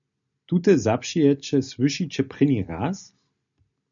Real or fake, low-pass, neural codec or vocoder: real; 7.2 kHz; none